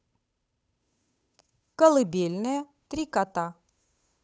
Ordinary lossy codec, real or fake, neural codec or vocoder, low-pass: none; fake; codec, 16 kHz, 8 kbps, FunCodec, trained on Chinese and English, 25 frames a second; none